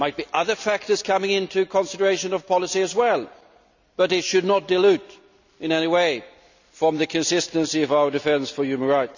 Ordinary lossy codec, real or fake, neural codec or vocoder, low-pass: none; real; none; 7.2 kHz